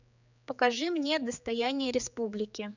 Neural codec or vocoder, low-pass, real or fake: codec, 16 kHz, 4 kbps, X-Codec, HuBERT features, trained on general audio; 7.2 kHz; fake